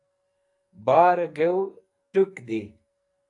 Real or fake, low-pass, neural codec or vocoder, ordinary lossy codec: fake; 10.8 kHz; codec, 44.1 kHz, 2.6 kbps, SNAC; AAC, 64 kbps